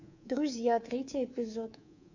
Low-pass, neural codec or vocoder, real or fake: 7.2 kHz; codec, 24 kHz, 3.1 kbps, DualCodec; fake